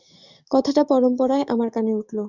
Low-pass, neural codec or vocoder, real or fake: 7.2 kHz; codec, 44.1 kHz, 7.8 kbps, DAC; fake